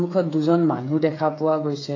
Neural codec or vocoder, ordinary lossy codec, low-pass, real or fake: codec, 16 kHz, 8 kbps, FreqCodec, smaller model; AAC, 32 kbps; 7.2 kHz; fake